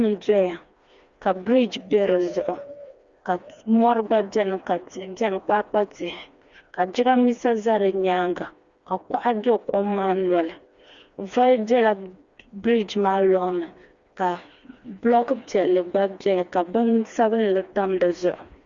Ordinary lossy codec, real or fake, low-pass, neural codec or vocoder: Opus, 64 kbps; fake; 7.2 kHz; codec, 16 kHz, 2 kbps, FreqCodec, smaller model